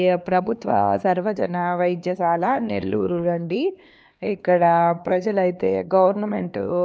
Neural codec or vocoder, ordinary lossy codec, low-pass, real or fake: codec, 16 kHz, 2 kbps, X-Codec, HuBERT features, trained on LibriSpeech; none; none; fake